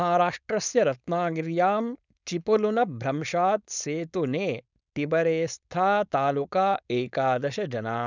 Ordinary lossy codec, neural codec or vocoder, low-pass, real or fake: none; codec, 16 kHz, 4.8 kbps, FACodec; 7.2 kHz; fake